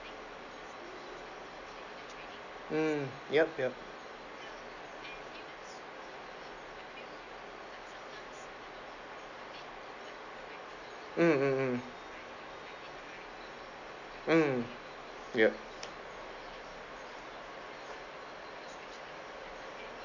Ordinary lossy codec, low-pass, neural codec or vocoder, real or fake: none; 7.2 kHz; none; real